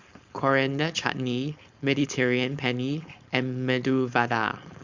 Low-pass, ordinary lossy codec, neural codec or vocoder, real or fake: 7.2 kHz; Opus, 64 kbps; codec, 16 kHz, 4.8 kbps, FACodec; fake